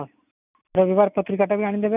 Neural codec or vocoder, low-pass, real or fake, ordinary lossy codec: none; 3.6 kHz; real; none